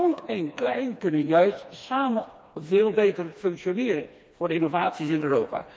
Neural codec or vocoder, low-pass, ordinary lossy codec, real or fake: codec, 16 kHz, 2 kbps, FreqCodec, smaller model; none; none; fake